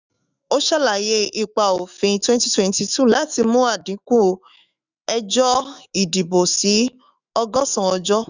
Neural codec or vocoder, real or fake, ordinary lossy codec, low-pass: autoencoder, 48 kHz, 128 numbers a frame, DAC-VAE, trained on Japanese speech; fake; none; 7.2 kHz